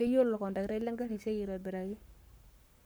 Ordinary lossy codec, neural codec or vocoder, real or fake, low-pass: none; codec, 44.1 kHz, 7.8 kbps, Pupu-Codec; fake; none